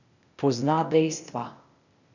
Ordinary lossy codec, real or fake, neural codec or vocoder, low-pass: none; fake; codec, 16 kHz, 0.8 kbps, ZipCodec; 7.2 kHz